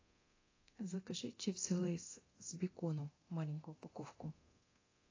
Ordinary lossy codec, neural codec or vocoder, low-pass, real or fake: AAC, 32 kbps; codec, 24 kHz, 0.9 kbps, DualCodec; 7.2 kHz; fake